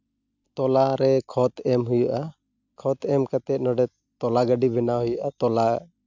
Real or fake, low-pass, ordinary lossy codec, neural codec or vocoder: real; 7.2 kHz; none; none